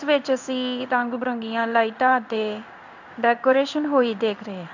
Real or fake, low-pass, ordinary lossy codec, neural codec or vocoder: fake; 7.2 kHz; none; codec, 16 kHz in and 24 kHz out, 1 kbps, XY-Tokenizer